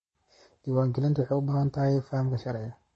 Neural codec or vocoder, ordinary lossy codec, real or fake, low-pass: codec, 44.1 kHz, 7.8 kbps, Pupu-Codec; MP3, 32 kbps; fake; 10.8 kHz